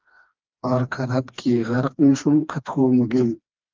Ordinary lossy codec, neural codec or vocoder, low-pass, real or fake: Opus, 32 kbps; codec, 16 kHz, 2 kbps, FreqCodec, smaller model; 7.2 kHz; fake